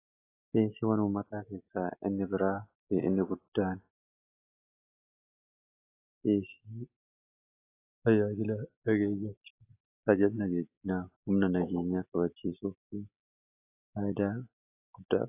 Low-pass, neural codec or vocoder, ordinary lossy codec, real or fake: 3.6 kHz; none; AAC, 24 kbps; real